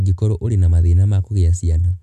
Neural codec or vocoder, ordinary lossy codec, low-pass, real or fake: none; MP3, 96 kbps; 14.4 kHz; real